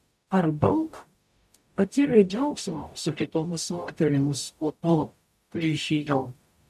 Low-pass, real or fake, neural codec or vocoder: 14.4 kHz; fake; codec, 44.1 kHz, 0.9 kbps, DAC